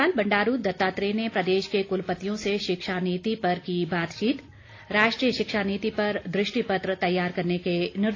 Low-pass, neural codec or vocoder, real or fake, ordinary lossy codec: 7.2 kHz; none; real; AAC, 32 kbps